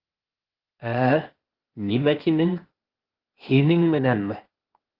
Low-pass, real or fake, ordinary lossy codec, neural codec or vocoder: 5.4 kHz; fake; Opus, 16 kbps; codec, 16 kHz, 0.8 kbps, ZipCodec